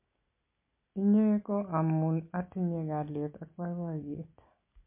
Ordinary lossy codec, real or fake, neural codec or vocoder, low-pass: MP3, 24 kbps; real; none; 3.6 kHz